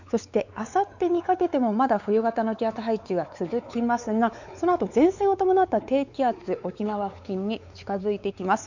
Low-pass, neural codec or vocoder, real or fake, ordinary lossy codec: 7.2 kHz; codec, 16 kHz, 4 kbps, X-Codec, WavLM features, trained on Multilingual LibriSpeech; fake; none